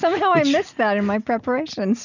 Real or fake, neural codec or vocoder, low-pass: real; none; 7.2 kHz